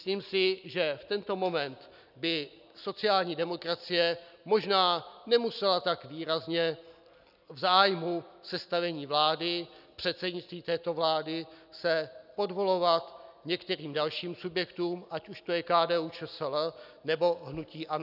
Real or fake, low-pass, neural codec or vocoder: real; 5.4 kHz; none